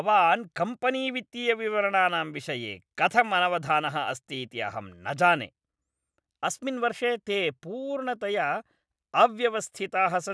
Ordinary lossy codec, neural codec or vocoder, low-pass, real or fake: none; none; none; real